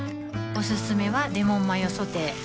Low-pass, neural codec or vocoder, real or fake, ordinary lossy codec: none; none; real; none